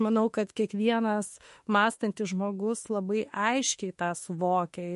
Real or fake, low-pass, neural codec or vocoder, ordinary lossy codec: fake; 14.4 kHz; autoencoder, 48 kHz, 32 numbers a frame, DAC-VAE, trained on Japanese speech; MP3, 48 kbps